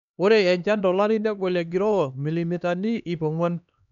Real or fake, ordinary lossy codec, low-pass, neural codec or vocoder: fake; MP3, 96 kbps; 7.2 kHz; codec, 16 kHz, 2 kbps, X-Codec, HuBERT features, trained on LibriSpeech